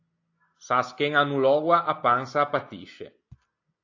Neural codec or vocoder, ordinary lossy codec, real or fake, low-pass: none; AAC, 48 kbps; real; 7.2 kHz